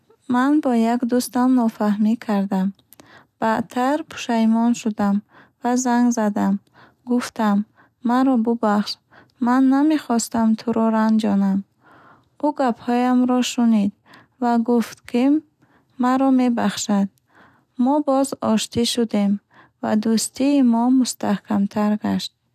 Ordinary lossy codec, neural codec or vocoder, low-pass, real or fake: none; none; 14.4 kHz; real